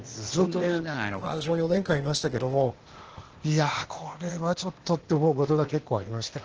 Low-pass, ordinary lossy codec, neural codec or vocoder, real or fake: 7.2 kHz; Opus, 16 kbps; codec, 16 kHz, 0.8 kbps, ZipCodec; fake